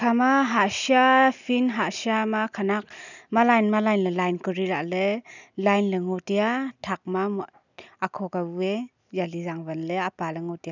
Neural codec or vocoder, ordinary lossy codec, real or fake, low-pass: none; none; real; 7.2 kHz